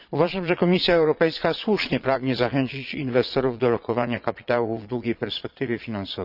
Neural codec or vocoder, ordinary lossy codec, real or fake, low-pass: vocoder, 22.05 kHz, 80 mel bands, Vocos; none; fake; 5.4 kHz